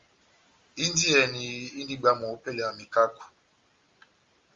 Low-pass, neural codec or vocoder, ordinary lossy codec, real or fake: 7.2 kHz; none; Opus, 32 kbps; real